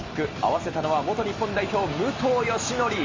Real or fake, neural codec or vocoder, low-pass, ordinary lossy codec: real; none; 7.2 kHz; Opus, 32 kbps